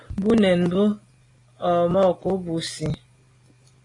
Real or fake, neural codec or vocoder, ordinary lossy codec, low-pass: real; none; AAC, 32 kbps; 10.8 kHz